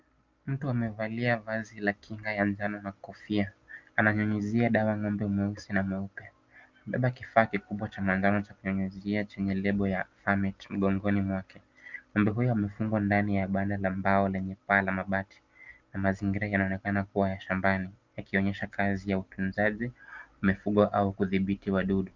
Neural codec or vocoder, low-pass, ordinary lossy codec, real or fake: none; 7.2 kHz; Opus, 24 kbps; real